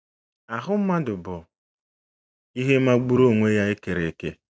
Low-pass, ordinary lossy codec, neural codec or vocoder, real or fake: none; none; none; real